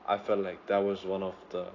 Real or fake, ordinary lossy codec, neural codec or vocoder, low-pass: real; AAC, 32 kbps; none; 7.2 kHz